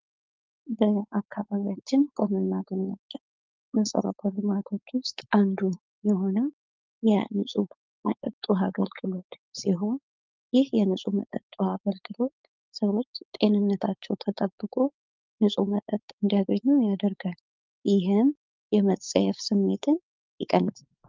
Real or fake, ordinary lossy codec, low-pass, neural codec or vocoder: fake; Opus, 24 kbps; 7.2 kHz; codec, 16 kHz, 4.8 kbps, FACodec